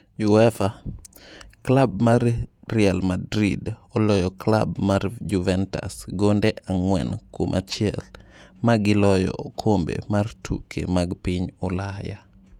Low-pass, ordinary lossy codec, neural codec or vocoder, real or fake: 19.8 kHz; none; vocoder, 48 kHz, 128 mel bands, Vocos; fake